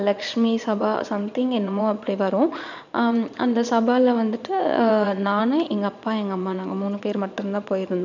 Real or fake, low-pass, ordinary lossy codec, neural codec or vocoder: fake; 7.2 kHz; none; vocoder, 22.05 kHz, 80 mel bands, Vocos